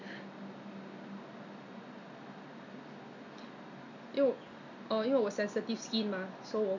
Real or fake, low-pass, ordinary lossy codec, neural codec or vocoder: real; 7.2 kHz; none; none